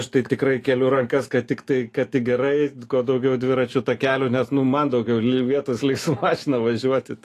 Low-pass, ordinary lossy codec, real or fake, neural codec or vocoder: 14.4 kHz; AAC, 48 kbps; fake; autoencoder, 48 kHz, 128 numbers a frame, DAC-VAE, trained on Japanese speech